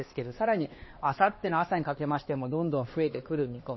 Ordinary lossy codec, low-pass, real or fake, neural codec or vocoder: MP3, 24 kbps; 7.2 kHz; fake; codec, 16 kHz, 2 kbps, X-Codec, HuBERT features, trained on LibriSpeech